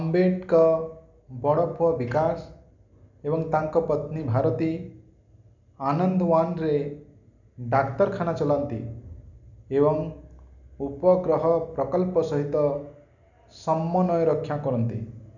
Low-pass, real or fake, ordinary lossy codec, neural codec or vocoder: 7.2 kHz; real; none; none